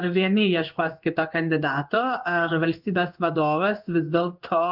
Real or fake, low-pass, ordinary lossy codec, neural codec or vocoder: fake; 5.4 kHz; Opus, 24 kbps; codec, 16 kHz in and 24 kHz out, 1 kbps, XY-Tokenizer